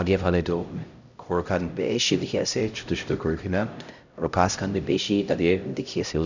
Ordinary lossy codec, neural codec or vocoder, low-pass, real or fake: none; codec, 16 kHz, 0.5 kbps, X-Codec, HuBERT features, trained on LibriSpeech; 7.2 kHz; fake